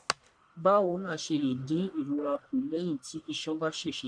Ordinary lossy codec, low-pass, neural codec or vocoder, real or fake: none; 9.9 kHz; codec, 44.1 kHz, 1.7 kbps, Pupu-Codec; fake